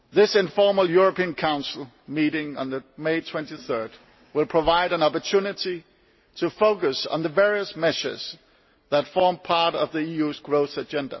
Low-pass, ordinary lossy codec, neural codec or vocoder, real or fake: 7.2 kHz; MP3, 24 kbps; none; real